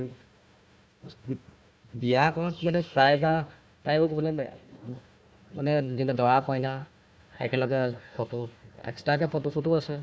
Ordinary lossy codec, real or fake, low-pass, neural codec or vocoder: none; fake; none; codec, 16 kHz, 1 kbps, FunCodec, trained on Chinese and English, 50 frames a second